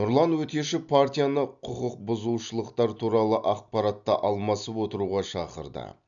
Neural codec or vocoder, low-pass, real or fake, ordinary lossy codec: none; 7.2 kHz; real; none